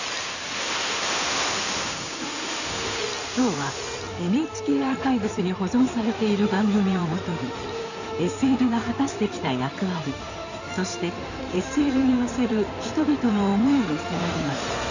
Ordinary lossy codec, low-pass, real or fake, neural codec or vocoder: none; 7.2 kHz; fake; codec, 16 kHz in and 24 kHz out, 2.2 kbps, FireRedTTS-2 codec